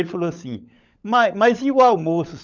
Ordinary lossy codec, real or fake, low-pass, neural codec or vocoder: none; fake; 7.2 kHz; codec, 16 kHz, 16 kbps, FunCodec, trained on LibriTTS, 50 frames a second